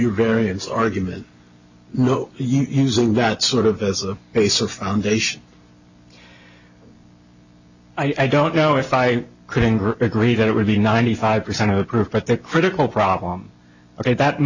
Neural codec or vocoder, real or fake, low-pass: none; real; 7.2 kHz